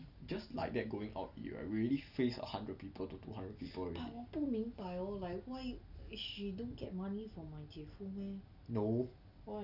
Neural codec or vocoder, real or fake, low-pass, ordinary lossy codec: none; real; 5.4 kHz; none